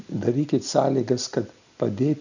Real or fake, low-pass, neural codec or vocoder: real; 7.2 kHz; none